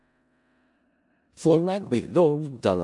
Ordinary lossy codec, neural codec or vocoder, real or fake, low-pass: AAC, 64 kbps; codec, 16 kHz in and 24 kHz out, 0.4 kbps, LongCat-Audio-Codec, four codebook decoder; fake; 10.8 kHz